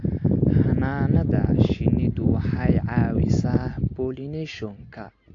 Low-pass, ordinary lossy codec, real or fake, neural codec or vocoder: 7.2 kHz; AAC, 48 kbps; real; none